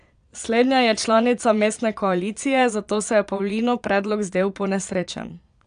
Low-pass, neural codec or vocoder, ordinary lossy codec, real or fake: 9.9 kHz; vocoder, 22.05 kHz, 80 mel bands, Vocos; none; fake